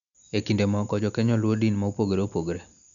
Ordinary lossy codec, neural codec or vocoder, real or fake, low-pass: none; none; real; 7.2 kHz